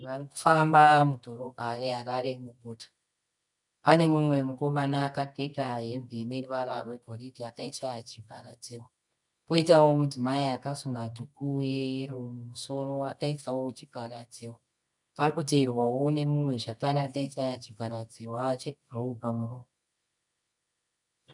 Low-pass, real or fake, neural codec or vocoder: 10.8 kHz; fake; codec, 24 kHz, 0.9 kbps, WavTokenizer, medium music audio release